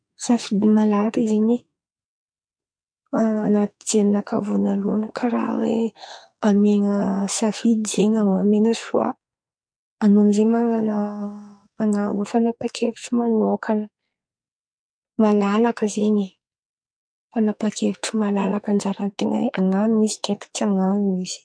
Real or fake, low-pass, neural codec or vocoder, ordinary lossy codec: fake; 9.9 kHz; codec, 44.1 kHz, 2.6 kbps, SNAC; MP3, 64 kbps